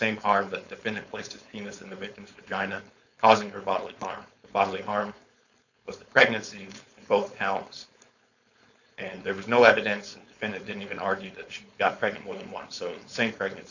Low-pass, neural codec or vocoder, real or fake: 7.2 kHz; codec, 16 kHz, 4.8 kbps, FACodec; fake